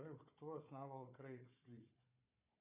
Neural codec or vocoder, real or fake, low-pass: codec, 16 kHz, 4 kbps, FunCodec, trained on Chinese and English, 50 frames a second; fake; 3.6 kHz